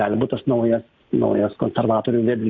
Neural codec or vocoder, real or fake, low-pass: none; real; 7.2 kHz